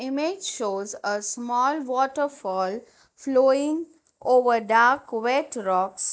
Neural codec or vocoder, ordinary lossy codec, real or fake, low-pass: none; none; real; none